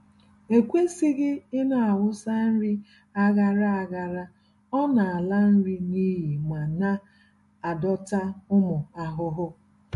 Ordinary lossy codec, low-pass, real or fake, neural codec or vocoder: MP3, 48 kbps; 14.4 kHz; real; none